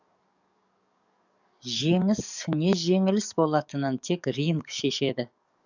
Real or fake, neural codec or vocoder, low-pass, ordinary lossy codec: fake; codec, 44.1 kHz, 7.8 kbps, DAC; 7.2 kHz; none